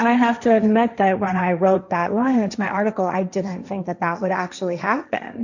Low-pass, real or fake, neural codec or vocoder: 7.2 kHz; fake; codec, 16 kHz, 1.1 kbps, Voila-Tokenizer